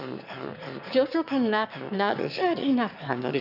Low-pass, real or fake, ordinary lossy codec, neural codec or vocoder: 5.4 kHz; fake; none; autoencoder, 22.05 kHz, a latent of 192 numbers a frame, VITS, trained on one speaker